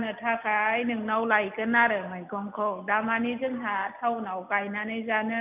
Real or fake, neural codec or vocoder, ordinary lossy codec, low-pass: real; none; none; 3.6 kHz